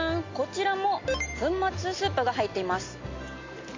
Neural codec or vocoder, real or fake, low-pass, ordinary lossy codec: none; real; 7.2 kHz; MP3, 48 kbps